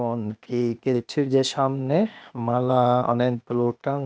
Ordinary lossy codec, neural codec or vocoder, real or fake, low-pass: none; codec, 16 kHz, 0.8 kbps, ZipCodec; fake; none